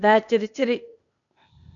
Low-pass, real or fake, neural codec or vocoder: 7.2 kHz; fake; codec, 16 kHz, 0.8 kbps, ZipCodec